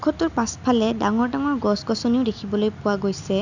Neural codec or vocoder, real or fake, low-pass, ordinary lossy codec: none; real; 7.2 kHz; none